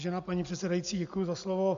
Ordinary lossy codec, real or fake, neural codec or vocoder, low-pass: MP3, 64 kbps; real; none; 7.2 kHz